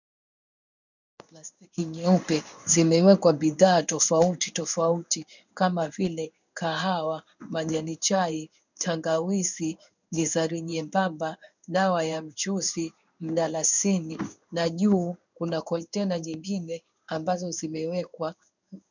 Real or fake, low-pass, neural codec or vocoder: fake; 7.2 kHz; codec, 16 kHz in and 24 kHz out, 1 kbps, XY-Tokenizer